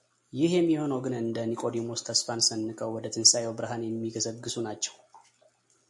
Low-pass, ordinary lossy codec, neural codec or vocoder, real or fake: 10.8 kHz; MP3, 64 kbps; none; real